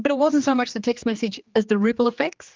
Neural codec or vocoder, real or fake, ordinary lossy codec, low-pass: codec, 16 kHz, 2 kbps, X-Codec, HuBERT features, trained on general audio; fake; Opus, 32 kbps; 7.2 kHz